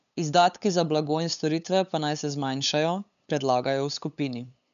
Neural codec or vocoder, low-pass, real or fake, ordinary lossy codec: codec, 16 kHz, 8 kbps, FunCodec, trained on LibriTTS, 25 frames a second; 7.2 kHz; fake; none